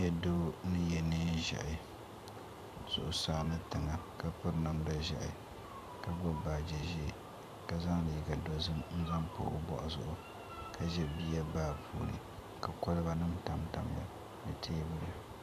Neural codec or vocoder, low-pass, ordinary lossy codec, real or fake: vocoder, 44.1 kHz, 128 mel bands every 512 samples, BigVGAN v2; 14.4 kHz; MP3, 96 kbps; fake